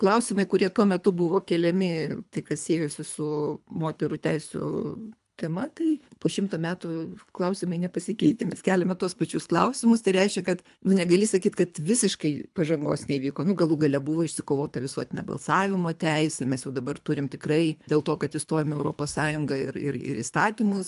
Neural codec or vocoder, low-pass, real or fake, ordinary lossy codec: codec, 24 kHz, 3 kbps, HILCodec; 10.8 kHz; fake; AAC, 96 kbps